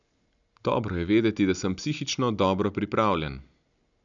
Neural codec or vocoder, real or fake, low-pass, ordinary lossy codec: none; real; 7.2 kHz; none